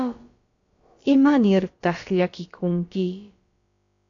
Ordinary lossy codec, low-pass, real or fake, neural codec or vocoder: AAC, 48 kbps; 7.2 kHz; fake; codec, 16 kHz, about 1 kbps, DyCAST, with the encoder's durations